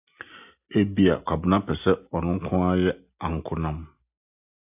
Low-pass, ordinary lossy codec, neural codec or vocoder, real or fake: 3.6 kHz; AAC, 32 kbps; none; real